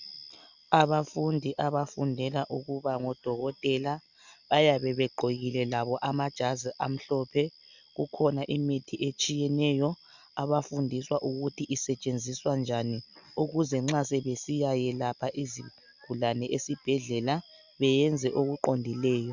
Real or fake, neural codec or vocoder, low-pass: real; none; 7.2 kHz